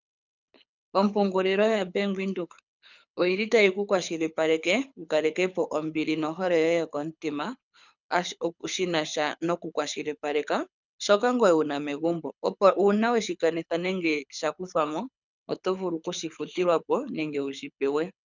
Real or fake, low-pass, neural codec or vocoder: fake; 7.2 kHz; codec, 24 kHz, 6 kbps, HILCodec